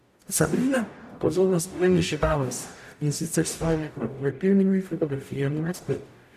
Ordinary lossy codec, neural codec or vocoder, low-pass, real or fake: none; codec, 44.1 kHz, 0.9 kbps, DAC; 14.4 kHz; fake